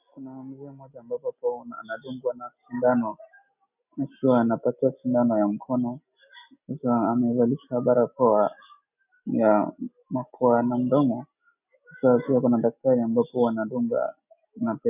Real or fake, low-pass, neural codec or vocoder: real; 3.6 kHz; none